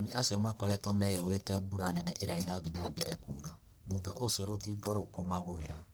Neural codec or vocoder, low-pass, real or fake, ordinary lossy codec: codec, 44.1 kHz, 1.7 kbps, Pupu-Codec; none; fake; none